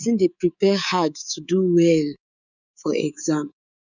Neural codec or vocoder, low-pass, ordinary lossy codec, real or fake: codec, 24 kHz, 3.1 kbps, DualCodec; 7.2 kHz; none; fake